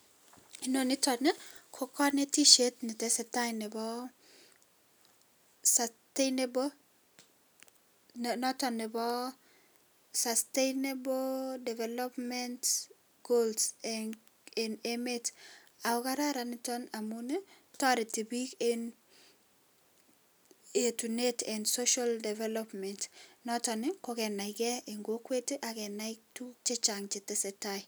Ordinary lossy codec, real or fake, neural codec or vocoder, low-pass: none; real; none; none